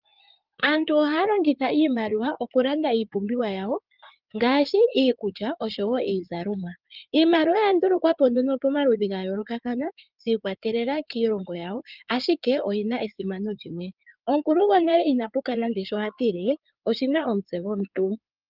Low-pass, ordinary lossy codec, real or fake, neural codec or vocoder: 5.4 kHz; Opus, 24 kbps; fake; codec, 16 kHz in and 24 kHz out, 2.2 kbps, FireRedTTS-2 codec